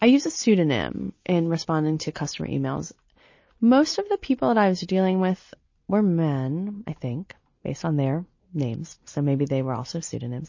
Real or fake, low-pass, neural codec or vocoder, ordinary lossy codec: real; 7.2 kHz; none; MP3, 32 kbps